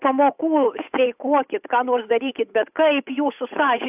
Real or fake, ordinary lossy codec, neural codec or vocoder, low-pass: fake; AAC, 32 kbps; codec, 16 kHz, 8 kbps, FunCodec, trained on Chinese and English, 25 frames a second; 3.6 kHz